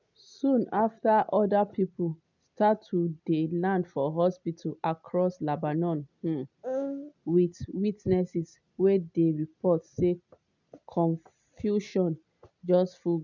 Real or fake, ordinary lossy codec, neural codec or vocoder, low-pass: real; none; none; 7.2 kHz